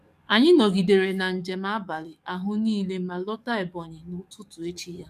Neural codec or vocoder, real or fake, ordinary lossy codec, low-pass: codec, 44.1 kHz, 7.8 kbps, DAC; fake; MP3, 96 kbps; 14.4 kHz